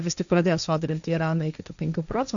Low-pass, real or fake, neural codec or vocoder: 7.2 kHz; fake; codec, 16 kHz, 1.1 kbps, Voila-Tokenizer